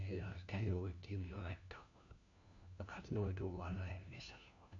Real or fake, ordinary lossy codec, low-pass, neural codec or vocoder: fake; none; 7.2 kHz; codec, 16 kHz, 1 kbps, FunCodec, trained on LibriTTS, 50 frames a second